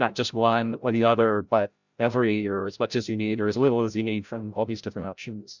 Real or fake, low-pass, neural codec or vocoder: fake; 7.2 kHz; codec, 16 kHz, 0.5 kbps, FreqCodec, larger model